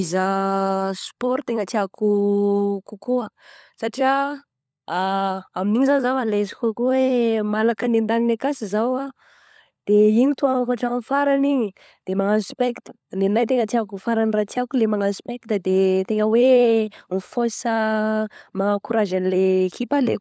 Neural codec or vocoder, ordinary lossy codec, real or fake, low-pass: codec, 16 kHz, 16 kbps, FunCodec, trained on LibriTTS, 50 frames a second; none; fake; none